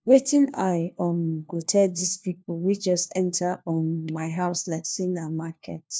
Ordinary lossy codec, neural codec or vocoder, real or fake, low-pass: none; codec, 16 kHz, 1 kbps, FunCodec, trained on LibriTTS, 50 frames a second; fake; none